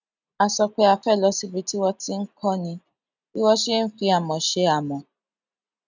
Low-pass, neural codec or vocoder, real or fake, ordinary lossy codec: 7.2 kHz; none; real; none